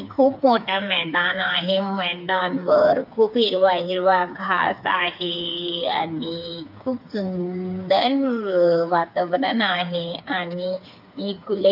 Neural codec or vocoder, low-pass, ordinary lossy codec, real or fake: codec, 16 kHz, 4 kbps, FreqCodec, smaller model; 5.4 kHz; none; fake